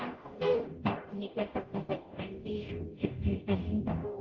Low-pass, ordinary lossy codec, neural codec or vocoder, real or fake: 7.2 kHz; Opus, 16 kbps; codec, 44.1 kHz, 0.9 kbps, DAC; fake